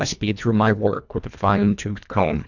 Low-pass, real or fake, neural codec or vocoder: 7.2 kHz; fake; codec, 24 kHz, 1.5 kbps, HILCodec